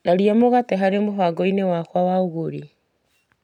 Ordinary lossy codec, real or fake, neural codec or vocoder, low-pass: none; real; none; 19.8 kHz